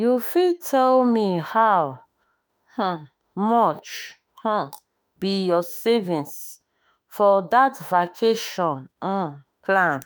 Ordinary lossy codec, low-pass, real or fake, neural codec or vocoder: none; none; fake; autoencoder, 48 kHz, 32 numbers a frame, DAC-VAE, trained on Japanese speech